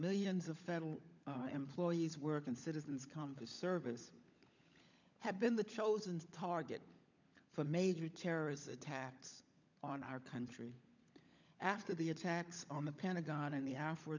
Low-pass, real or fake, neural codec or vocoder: 7.2 kHz; fake; codec, 16 kHz, 16 kbps, FunCodec, trained on LibriTTS, 50 frames a second